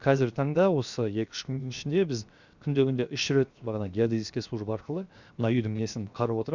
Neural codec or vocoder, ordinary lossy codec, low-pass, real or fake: codec, 16 kHz, 0.7 kbps, FocalCodec; Opus, 64 kbps; 7.2 kHz; fake